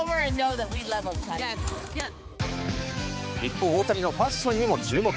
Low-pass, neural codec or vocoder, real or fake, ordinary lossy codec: none; codec, 16 kHz, 4 kbps, X-Codec, HuBERT features, trained on balanced general audio; fake; none